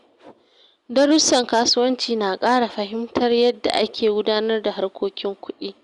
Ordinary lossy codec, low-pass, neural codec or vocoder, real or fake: Opus, 64 kbps; 10.8 kHz; none; real